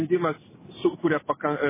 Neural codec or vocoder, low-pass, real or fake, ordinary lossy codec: none; 3.6 kHz; real; MP3, 16 kbps